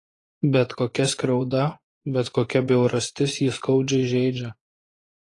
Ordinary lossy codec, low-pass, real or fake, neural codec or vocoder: AAC, 32 kbps; 10.8 kHz; fake; vocoder, 24 kHz, 100 mel bands, Vocos